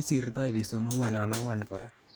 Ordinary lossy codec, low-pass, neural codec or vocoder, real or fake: none; none; codec, 44.1 kHz, 2.6 kbps, DAC; fake